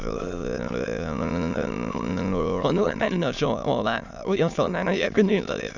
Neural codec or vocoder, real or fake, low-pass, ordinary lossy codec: autoencoder, 22.05 kHz, a latent of 192 numbers a frame, VITS, trained on many speakers; fake; 7.2 kHz; none